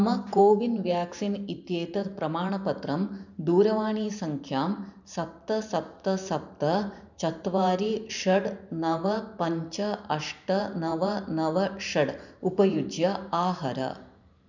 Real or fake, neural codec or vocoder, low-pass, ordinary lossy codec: fake; vocoder, 44.1 kHz, 128 mel bands every 512 samples, BigVGAN v2; 7.2 kHz; none